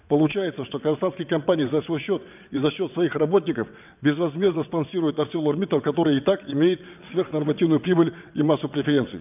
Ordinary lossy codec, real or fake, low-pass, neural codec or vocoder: none; fake; 3.6 kHz; vocoder, 22.05 kHz, 80 mel bands, WaveNeXt